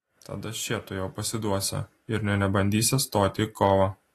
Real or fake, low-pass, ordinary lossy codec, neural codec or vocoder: real; 14.4 kHz; AAC, 48 kbps; none